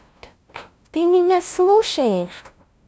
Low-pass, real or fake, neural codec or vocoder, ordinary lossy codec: none; fake; codec, 16 kHz, 0.5 kbps, FunCodec, trained on LibriTTS, 25 frames a second; none